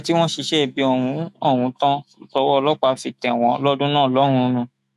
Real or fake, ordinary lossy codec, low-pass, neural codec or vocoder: fake; none; 14.4 kHz; autoencoder, 48 kHz, 128 numbers a frame, DAC-VAE, trained on Japanese speech